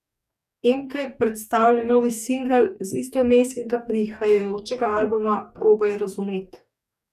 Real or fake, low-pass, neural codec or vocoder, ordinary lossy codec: fake; 14.4 kHz; codec, 44.1 kHz, 2.6 kbps, DAC; none